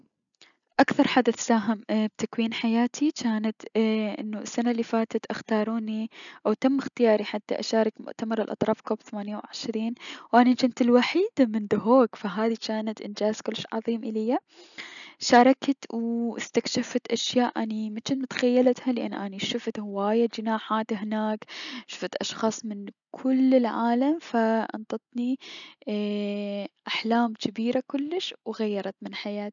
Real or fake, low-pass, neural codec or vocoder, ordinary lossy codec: real; 7.2 kHz; none; none